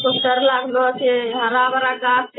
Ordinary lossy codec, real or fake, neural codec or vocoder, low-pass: AAC, 16 kbps; real; none; 7.2 kHz